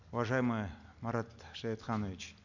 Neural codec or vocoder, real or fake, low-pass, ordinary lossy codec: none; real; 7.2 kHz; none